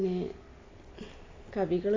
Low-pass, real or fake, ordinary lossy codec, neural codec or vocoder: 7.2 kHz; real; AAC, 32 kbps; none